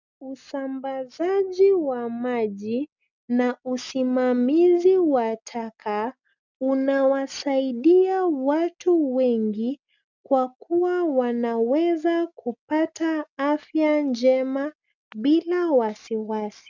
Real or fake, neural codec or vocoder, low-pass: real; none; 7.2 kHz